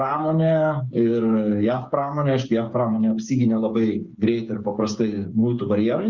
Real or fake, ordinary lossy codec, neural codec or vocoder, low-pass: fake; Opus, 64 kbps; codec, 16 kHz, 8 kbps, FreqCodec, smaller model; 7.2 kHz